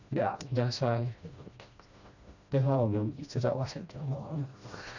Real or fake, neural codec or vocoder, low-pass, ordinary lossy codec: fake; codec, 16 kHz, 1 kbps, FreqCodec, smaller model; 7.2 kHz; none